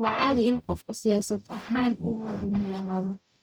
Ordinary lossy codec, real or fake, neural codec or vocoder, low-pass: none; fake; codec, 44.1 kHz, 0.9 kbps, DAC; none